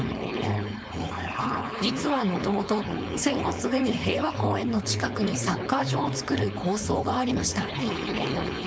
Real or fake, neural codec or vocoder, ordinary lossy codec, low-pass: fake; codec, 16 kHz, 4.8 kbps, FACodec; none; none